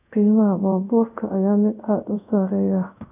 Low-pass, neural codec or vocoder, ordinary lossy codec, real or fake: 3.6 kHz; codec, 24 kHz, 0.5 kbps, DualCodec; none; fake